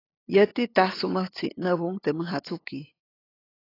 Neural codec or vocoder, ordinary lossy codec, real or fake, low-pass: codec, 16 kHz, 8 kbps, FunCodec, trained on LibriTTS, 25 frames a second; AAC, 24 kbps; fake; 5.4 kHz